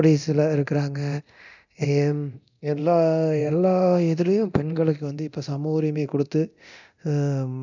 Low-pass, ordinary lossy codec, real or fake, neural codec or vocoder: 7.2 kHz; none; fake; codec, 24 kHz, 0.9 kbps, DualCodec